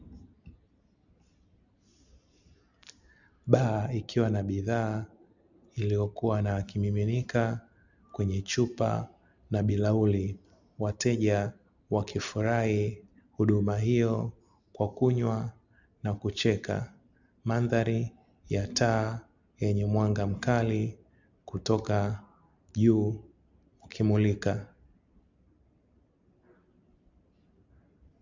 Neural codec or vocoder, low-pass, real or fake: none; 7.2 kHz; real